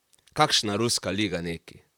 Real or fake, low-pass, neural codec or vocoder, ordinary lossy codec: fake; 19.8 kHz; vocoder, 44.1 kHz, 128 mel bands, Pupu-Vocoder; none